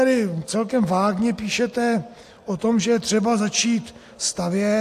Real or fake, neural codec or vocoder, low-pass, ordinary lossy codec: real; none; 14.4 kHz; AAC, 64 kbps